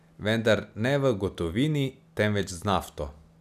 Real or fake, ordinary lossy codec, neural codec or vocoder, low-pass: real; none; none; 14.4 kHz